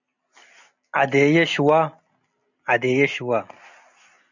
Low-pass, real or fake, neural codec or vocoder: 7.2 kHz; real; none